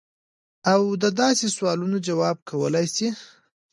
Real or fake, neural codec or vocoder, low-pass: real; none; 10.8 kHz